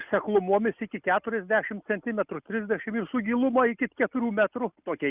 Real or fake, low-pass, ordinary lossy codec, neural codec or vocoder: real; 3.6 kHz; Opus, 64 kbps; none